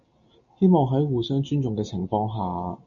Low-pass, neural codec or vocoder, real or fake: 7.2 kHz; none; real